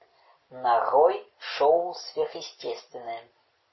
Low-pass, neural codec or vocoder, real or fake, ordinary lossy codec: 7.2 kHz; none; real; MP3, 24 kbps